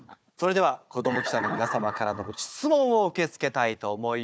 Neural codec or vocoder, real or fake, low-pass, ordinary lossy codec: codec, 16 kHz, 4 kbps, FunCodec, trained on Chinese and English, 50 frames a second; fake; none; none